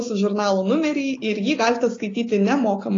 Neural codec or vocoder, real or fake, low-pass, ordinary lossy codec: none; real; 7.2 kHz; AAC, 32 kbps